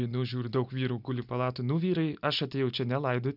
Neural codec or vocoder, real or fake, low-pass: none; real; 5.4 kHz